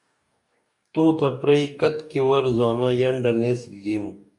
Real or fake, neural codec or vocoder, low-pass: fake; codec, 44.1 kHz, 2.6 kbps, DAC; 10.8 kHz